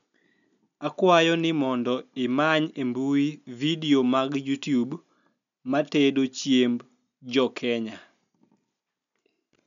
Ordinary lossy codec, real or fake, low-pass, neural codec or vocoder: none; real; 7.2 kHz; none